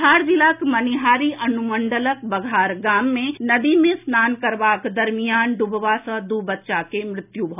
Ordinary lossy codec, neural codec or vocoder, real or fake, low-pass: none; none; real; 3.6 kHz